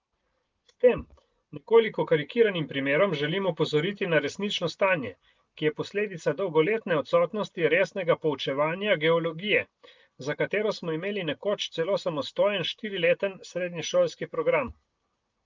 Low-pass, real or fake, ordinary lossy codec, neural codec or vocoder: 7.2 kHz; real; Opus, 32 kbps; none